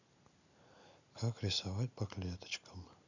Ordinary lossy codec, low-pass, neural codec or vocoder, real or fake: none; 7.2 kHz; none; real